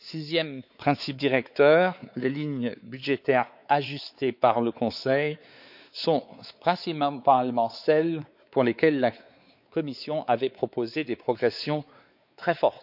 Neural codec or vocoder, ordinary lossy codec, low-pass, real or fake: codec, 16 kHz, 4 kbps, X-Codec, WavLM features, trained on Multilingual LibriSpeech; none; 5.4 kHz; fake